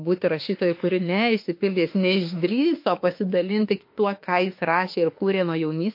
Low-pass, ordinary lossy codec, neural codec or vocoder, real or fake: 5.4 kHz; MP3, 32 kbps; autoencoder, 48 kHz, 32 numbers a frame, DAC-VAE, trained on Japanese speech; fake